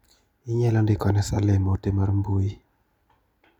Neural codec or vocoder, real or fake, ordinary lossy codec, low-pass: none; real; none; 19.8 kHz